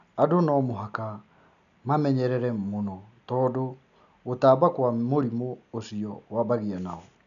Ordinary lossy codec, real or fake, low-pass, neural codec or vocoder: none; real; 7.2 kHz; none